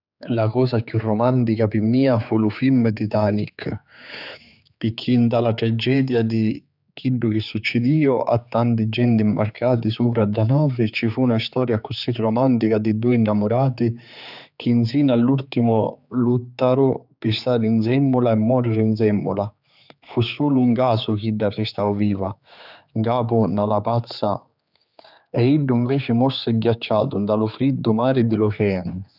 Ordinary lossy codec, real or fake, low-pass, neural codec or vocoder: none; fake; 5.4 kHz; codec, 16 kHz, 4 kbps, X-Codec, HuBERT features, trained on general audio